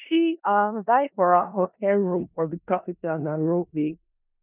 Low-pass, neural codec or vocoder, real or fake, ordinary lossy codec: 3.6 kHz; codec, 16 kHz in and 24 kHz out, 0.4 kbps, LongCat-Audio-Codec, four codebook decoder; fake; none